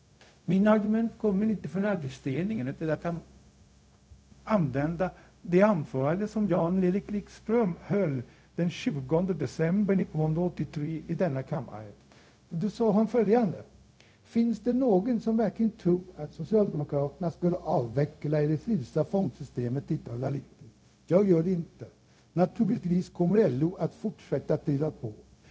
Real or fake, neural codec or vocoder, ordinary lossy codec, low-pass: fake; codec, 16 kHz, 0.4 kbps, LongCat-Audio-Codec; none; none